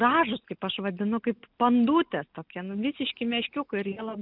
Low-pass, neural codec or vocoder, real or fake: 5.4 kHz; none; real